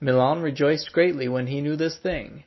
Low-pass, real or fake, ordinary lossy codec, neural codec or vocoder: 7.2 kHz; real; MP3, 24 kbps; none